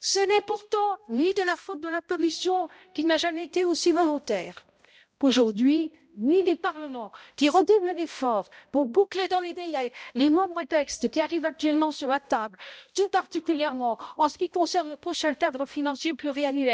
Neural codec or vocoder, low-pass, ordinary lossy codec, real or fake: codec, 16 kHz, 0.5 kbps, X-Codec, HuBERT features, trained on balanced general audio; none; none; fake